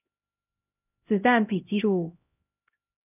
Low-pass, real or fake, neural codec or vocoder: 3.6 kHz; fake; codec, 16 kHz, 0.5 kbps, X-Codec, HuBERT features, trained on LibriSpeech